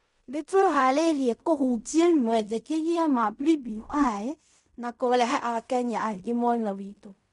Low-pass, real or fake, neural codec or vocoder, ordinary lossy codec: 10.8 kHz; fake; codec, 16 kHz in and 24 kHz out, 0.4 kbps, LongCat-Audio-Codec, fine tuned four codebook decoder; MP3, 64 kbps